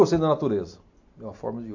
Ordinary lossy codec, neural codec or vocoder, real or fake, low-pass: AAC, 48 kbps; none; real; 7.2 kHz